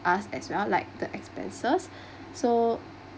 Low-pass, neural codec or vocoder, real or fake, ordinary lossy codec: none; none; real; none